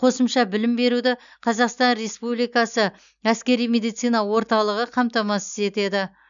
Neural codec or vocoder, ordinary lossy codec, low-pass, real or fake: none; none; 7.2 kHz; real